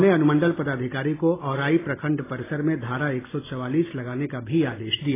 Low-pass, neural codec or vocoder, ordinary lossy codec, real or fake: 3.6 kHz; none; AAC, 16 kbps; real